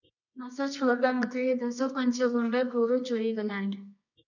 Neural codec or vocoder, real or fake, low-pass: codec, 24 kHz, 0.9 kbps, WavTokenizer, medium music audio release; fake; 7.2 kHz